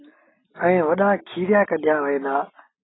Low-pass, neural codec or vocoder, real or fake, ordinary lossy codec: 7.2 kHz; none; real; AAC, 16 kbps